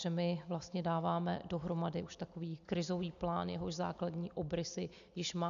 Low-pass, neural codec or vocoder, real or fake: 7.2 kHz; none; real